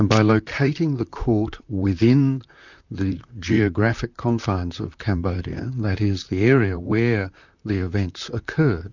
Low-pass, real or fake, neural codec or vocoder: 7.2 kHz; fake; vocoder, 44.1 kHz, 128 mel bands, Pupu-Vocoder